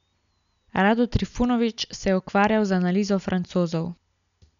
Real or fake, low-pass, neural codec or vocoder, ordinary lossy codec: real; 7.2 kHz; none; none